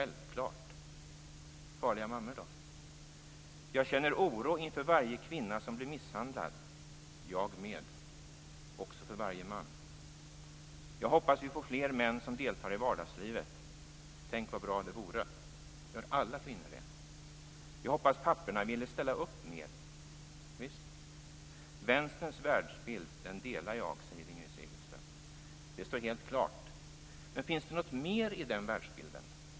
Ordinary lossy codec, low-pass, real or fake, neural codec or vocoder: none; none; real; none